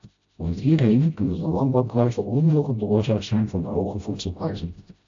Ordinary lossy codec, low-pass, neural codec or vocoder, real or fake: AAC, 48 kbps; 7.2 kHz; codec, 16 kHz, 0.5 kbps, FreqCodec, smaller model; fake